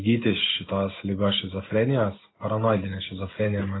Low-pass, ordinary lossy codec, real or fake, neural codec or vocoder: 7.2 kHz; AAC, 16 kbps; real; none